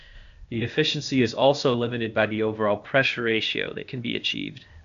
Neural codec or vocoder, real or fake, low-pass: codec, 16 kHz, 0.8 kbps, ZipCodec; fake; 7.2 kHz